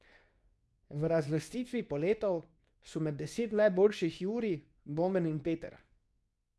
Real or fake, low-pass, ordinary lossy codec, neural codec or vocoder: fake; none; none; codec, 24 kHz, 0.9 kbps, WavTokenizer, medium speech release version 2